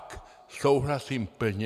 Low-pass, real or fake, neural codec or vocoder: 14.4 kHz; real; none